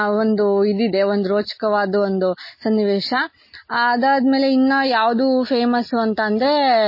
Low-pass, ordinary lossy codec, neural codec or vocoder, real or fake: 5.4 kHz; MP3, 24 kbps; none; real